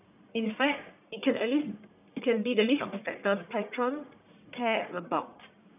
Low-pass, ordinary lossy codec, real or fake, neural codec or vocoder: 3.6 kHz; none; fake; codec, 44.1 kHz, 1.7 kbps, Pupu-Codec